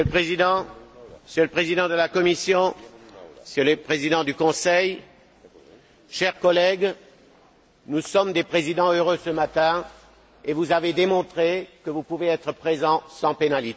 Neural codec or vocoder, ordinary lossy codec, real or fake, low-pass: none; none; real; none